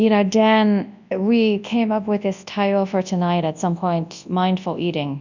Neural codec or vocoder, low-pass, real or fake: codec, 24 kHz, 0.9 kbps, WavTokenizer, large speech release; 7.2 kHz; fake